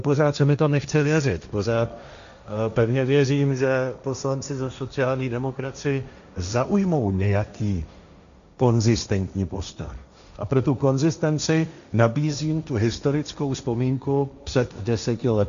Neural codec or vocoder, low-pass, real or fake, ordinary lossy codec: codec, 16 kHz, 1.1 kbps, Voila-Tokenizer; 7.2 kHz; fake; AAC, 96 kbps